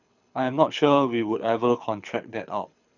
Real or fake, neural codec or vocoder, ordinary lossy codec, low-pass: fake; codec, 24 kHz, 6 kbps, HILCodec; none; 7.2 kHz